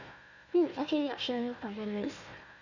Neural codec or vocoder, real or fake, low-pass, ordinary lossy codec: codec, 16 kHz, 1 kbps, FunCodec, trained on Chinese and English, 50 frames a second; fake; 7.2 kHz; none